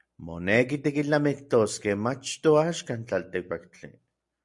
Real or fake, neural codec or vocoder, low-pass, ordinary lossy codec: real; none; 10.8 kHz; MP3, 64 kbps